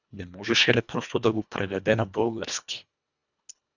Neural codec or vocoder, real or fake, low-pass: codec, 24 kHz, 1.5 kbps, HILCodec; fake; 7.2 kHz